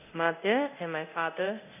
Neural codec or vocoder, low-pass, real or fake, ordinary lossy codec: codec, 24 kHz, 0.9 kbps, DualCodec; 3.6 kHz; fake; none